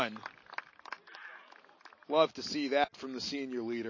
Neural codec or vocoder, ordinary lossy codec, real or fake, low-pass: none; MP3, 32 kbps; real; 7.2 kHz